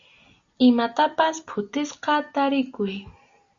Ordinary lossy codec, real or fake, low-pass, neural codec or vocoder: Opus, 64 kbps; real; 7.2 kHz; none